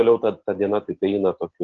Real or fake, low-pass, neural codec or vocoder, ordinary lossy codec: real; 7.2 kHz; none; Opus, 16 kbps